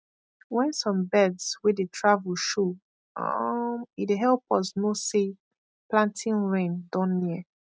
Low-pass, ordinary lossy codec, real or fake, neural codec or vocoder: none; none; real; none